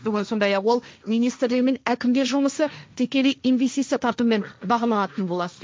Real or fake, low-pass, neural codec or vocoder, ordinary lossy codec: fake; none; codec, 16 kHz, 1.1 kbps, Voila-Tokenizer; none